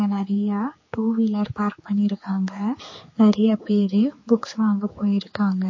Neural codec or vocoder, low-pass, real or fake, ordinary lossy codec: codec, 16 kHz, 4 kbps, X-Codec, HuBERT features, trained on general audio; 7.2 kHz; fake; MP3, 32 kbps